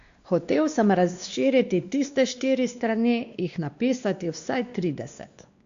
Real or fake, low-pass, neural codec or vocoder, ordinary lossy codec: fake; 7.2 kHz; codec, 16 kHz, 2 kbps, X-Codec, WavLM features, trained on Multilingual LibriSpeech; Opus, 64 kbps